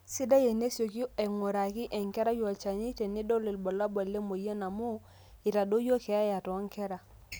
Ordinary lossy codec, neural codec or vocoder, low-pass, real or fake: none; none; none; real